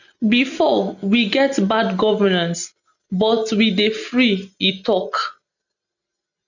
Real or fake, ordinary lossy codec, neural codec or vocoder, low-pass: real; none; none; 7.2 kHz